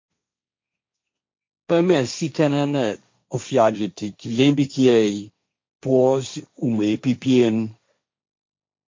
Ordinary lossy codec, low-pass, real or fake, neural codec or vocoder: MP3, 48 kbps; 7.2 kHz; fake; codec, 16 kHz, 1.1 kbps, Voila-Tokenizer